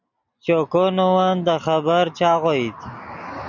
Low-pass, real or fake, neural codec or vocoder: 7.2 kHz; real; none